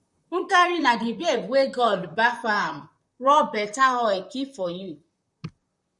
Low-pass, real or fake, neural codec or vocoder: 10.8 kHz; fake; vocoder, 44.1 kHz, 128 mel bands, Pupu-Vocoder